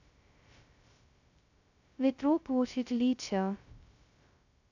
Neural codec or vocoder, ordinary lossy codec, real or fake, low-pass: codec, 16 kHz, 0.2 kbps, FocalCodec; none; fake; 7.2 kHz